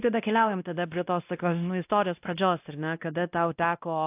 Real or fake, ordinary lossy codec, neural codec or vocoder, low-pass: fake; AAC, 32 kbps; codec, 16 kHz, 1 kbps, X-Codec, WavLM features, trained on Multilingual LibriSpeech; 3.6 kHz